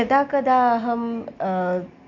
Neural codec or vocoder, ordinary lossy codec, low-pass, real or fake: none; none; 7.2 kHz; real